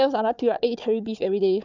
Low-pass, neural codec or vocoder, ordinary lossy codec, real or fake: 7.2 kHz; codec, 16 kHz, 4 kbps, FunCodec, trained on Chinese and English, 50 frames a second; none; fake